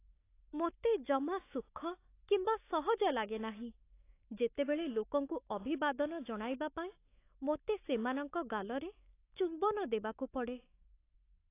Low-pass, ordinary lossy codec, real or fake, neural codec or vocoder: 3.6 kHz; AAC, 24 kbps; fake; autoencoder, 48 kHz, 128 numbers a frame, DAC-VAE, trained on Japanese speech